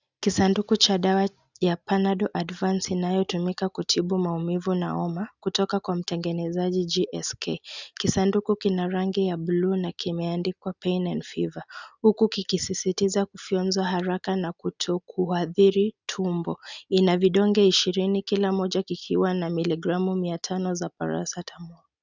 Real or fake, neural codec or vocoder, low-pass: real; none; 7.2 kHz